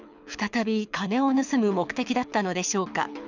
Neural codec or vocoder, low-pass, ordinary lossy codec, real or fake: codec, 24 kHz, 6 kbps, HILCodec; 7.2 kHz; none; fake